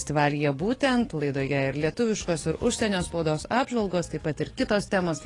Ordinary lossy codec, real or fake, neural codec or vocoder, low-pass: AAC, 32 kbps; fake; codec, 44.1 kHz, 7.8 kbps, DAC; 10.8 kHz